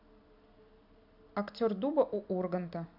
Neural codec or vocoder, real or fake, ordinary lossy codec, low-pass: none; real; none; 5.4 kHz